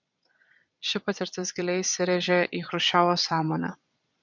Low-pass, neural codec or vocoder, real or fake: 7.2 kHz; vocoder, 22.05 kHz, 80 mel bands, Vocos; fake